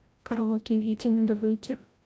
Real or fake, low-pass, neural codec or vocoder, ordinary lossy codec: fake; none; codec, 16 kHz, 0.5 kbps, FreqCodec, larger model; none